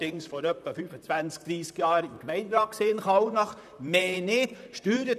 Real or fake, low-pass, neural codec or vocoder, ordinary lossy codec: fake; 14.4 kHz; vocoder, 44.1 kHz, 128 mel bands, Pupu-Vocoder; none